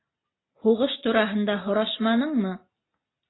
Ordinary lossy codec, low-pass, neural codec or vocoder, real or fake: AAC, 16 kbps; 7.2 kHz; none; real